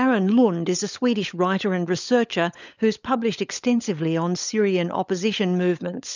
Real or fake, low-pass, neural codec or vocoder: fake; 7.2 kHz; codec, 16 kHz, 16 kbps, FunCodec, trained on LibriTTS, 50 frames a second